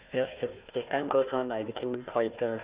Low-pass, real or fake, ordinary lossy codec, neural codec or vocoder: 3.6 kHz; fake; Opus, 64 kbps; codec, 16 kHz, 1 kbps, FunCodec, trained on Chinese and English, 50 frames a second